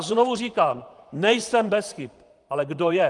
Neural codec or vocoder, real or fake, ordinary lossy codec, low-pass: vocoder, 44.1 kHz, 128 mel bands, Pupu-Vocoder; fake; Opus, 32 kbps; 10.8 kHz